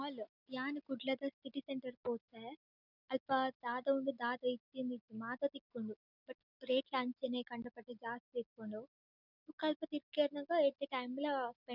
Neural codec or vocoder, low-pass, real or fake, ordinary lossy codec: none; 5.4 kHz; real; none